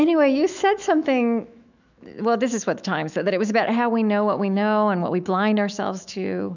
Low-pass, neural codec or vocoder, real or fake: 7.2 kHz; autoencoder, 48 kHz, 128 numbers a frame, DAC-VAE, trained on Japanese speech; fake